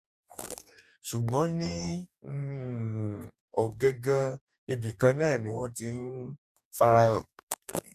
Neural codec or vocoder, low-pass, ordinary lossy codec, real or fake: codec, 44.1 kHz, 2.6 kbps, DAC; 14.4 kHz; none; fake